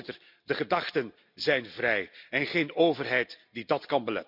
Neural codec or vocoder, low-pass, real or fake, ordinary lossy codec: none; 5.4 kHz; real; none